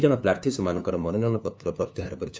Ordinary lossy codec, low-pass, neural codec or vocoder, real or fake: none; none; codec, 16 kHz, 2 kbps, FunCodec, trained on LibriTTS, 25 frames a second; fake